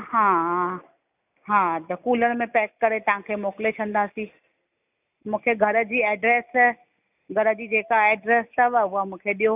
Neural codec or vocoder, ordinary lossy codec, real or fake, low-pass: none; none; real; 3.6 kHz